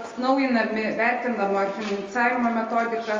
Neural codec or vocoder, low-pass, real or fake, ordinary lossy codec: none; 7.2 kHz; real; Opus, 32 kbps